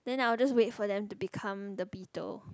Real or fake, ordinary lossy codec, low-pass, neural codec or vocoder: real; none; none; none